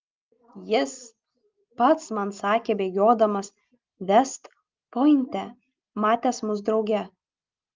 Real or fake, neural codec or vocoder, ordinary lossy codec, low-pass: real; none; Opus, 24 kbps; 7.2 kHz